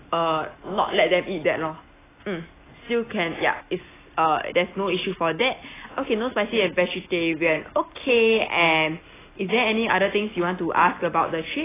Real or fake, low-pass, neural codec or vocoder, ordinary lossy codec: real; 3.6 kHz; none; AAC, 16 kbps